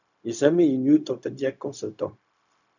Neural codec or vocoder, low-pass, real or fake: codec, 16 kHz, 0.4 kbps, LongCat-Audio-Codec; 7.2 kHz; fake